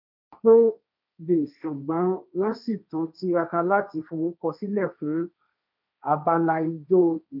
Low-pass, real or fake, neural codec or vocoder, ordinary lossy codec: 5.4 kHz; fake; codec, 16 kHz, 1.1 kbps, Voila-Tokenizer; none